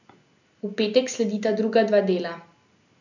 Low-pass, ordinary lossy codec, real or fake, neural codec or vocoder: 7.2 kHz; none; real; none